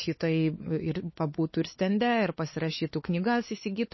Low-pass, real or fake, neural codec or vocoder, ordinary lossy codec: 7.2 kHz; fake; codec, 16 kHz, 4 kbps, X-Codec, WavLM features, trained on Multilingual LibriSpeech; MP3, 24 kbps